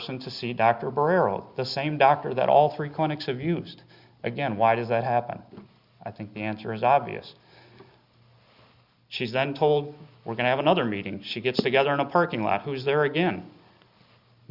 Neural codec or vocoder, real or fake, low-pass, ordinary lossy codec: none; real; 5.4 kHz; Opus, 64 kbps